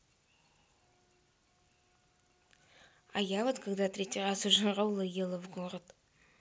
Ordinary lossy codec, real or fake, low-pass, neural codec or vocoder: none; real; none; none